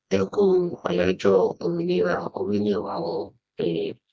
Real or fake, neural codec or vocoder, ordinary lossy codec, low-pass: fake; codec, 16 kHz, 1 kbps, FreqCodec, smaller model; none; none